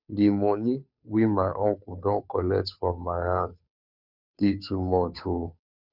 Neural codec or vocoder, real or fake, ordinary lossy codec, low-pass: codec, 16 kHz, 2 kbps, FunCodec, trained on Chinese and English, 25 frames a second; fake; none; 5.4 kHz